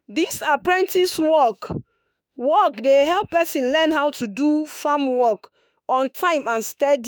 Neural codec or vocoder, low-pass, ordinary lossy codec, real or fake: autoencoder, 48 kHz, 32 numbers a frame, DAC-VAE, trained on Japanese speech; none; none; fake